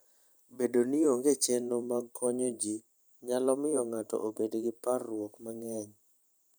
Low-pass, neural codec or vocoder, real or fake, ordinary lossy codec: none; vocoder, 44.1 kHz, 128 mel bands every 512 samples, BigVGAN v2; fake; none